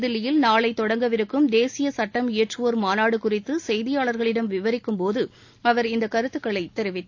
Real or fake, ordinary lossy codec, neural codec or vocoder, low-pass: real; AAC, 48 kbps; none; 7.2 kHz